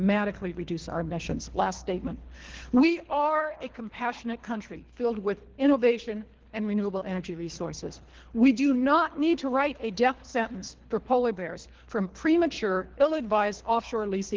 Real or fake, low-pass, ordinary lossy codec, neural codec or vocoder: fake; 7.2 kHz; Opus, 16 kbps; codec, 24 kHz, 3 kbps, HILCodec